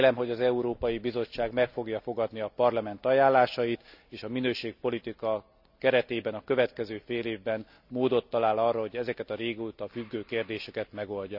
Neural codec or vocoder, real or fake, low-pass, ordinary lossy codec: none; real; 5.4 kHz; none